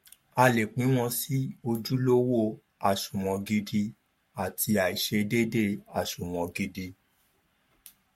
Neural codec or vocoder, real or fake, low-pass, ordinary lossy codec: codec, 44.1 kHz, 7.8 kbps, Pupu-Codec; fake; 19.8 kHz; MP3, 64 kbps